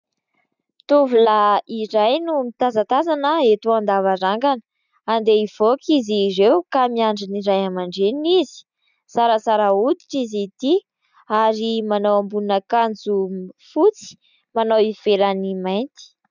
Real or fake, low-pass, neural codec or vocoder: real; 7.2 kHz; none